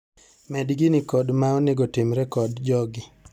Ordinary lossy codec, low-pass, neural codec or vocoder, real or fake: none; 19.8 kHz; none; real